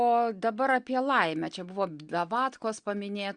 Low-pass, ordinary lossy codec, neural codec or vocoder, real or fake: 9.9 kHz; Opus, 64 kbps; none; real